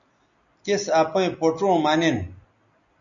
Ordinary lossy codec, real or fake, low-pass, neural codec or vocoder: MP3, 96 kbps; real; 7.2 kHz; none